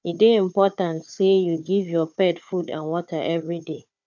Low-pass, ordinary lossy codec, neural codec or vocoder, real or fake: 7.2 kHz; none; codec, 16 kHz, 4 kbps, FunCodec, trained on Chinese and English, 50 frames a second; fake